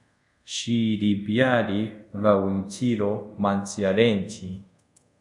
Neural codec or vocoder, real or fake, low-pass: codec, 24 kHz, 0.5 kbps, DualCodec; fake; 10.8 kHz